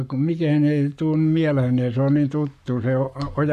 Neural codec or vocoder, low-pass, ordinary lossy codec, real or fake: codec, 44.1 kHz, 7.8 kbps, Pupu-Codec; 14.4 kHz; none; fake